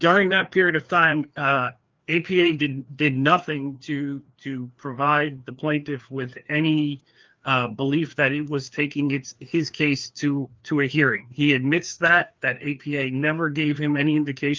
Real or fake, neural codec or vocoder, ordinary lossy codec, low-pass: fake; codec, 16 kHz, 2 kbps, FreqCodec, larger model; Opus, 24 kbps; 7.2 kHz